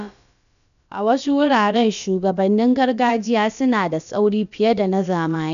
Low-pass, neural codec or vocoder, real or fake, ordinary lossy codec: 7.2 kHz; codec, 16 kHz, about 1 kbps, DyCAST, with the encoder's durations; fake; none